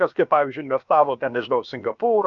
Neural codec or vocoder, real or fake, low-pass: codec, 16 kHz, about 1 kbps, DyCAST, with the encoder's durations; fake; 7.2 kHz